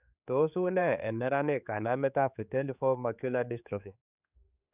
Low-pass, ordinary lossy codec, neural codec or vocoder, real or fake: 3.6 kHz; none; codec, 16 kHz, 4 kbps, X-Codec, HuBERT features, trained on general audio; fake